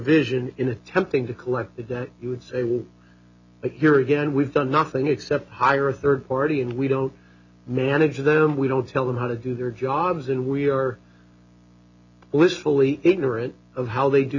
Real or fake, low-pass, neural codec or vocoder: real; 7.2 kHz; none